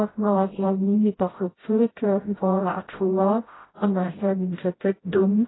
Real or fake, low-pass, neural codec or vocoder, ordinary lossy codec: fake; 7.2 kHz; codec, 16 kHz, 0.5 kbps, FreqCodec, smaller model; AAC, 16 kbps